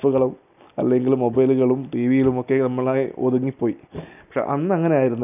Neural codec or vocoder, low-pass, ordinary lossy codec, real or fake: none; 3.6 kHz; none; real